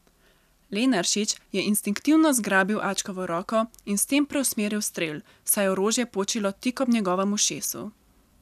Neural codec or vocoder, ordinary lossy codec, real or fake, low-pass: none; none; real; 14.4 kHz